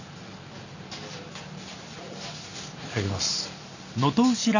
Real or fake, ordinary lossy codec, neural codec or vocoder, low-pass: real; none; none; 7.2 kHz